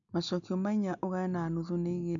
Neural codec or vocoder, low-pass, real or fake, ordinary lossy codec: none; 7.2 kHz; real; none